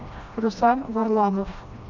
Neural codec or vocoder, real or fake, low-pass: codec, 16 kHz, 1 kbps, FreqCodec, smaller model; fake; 7.2 kHz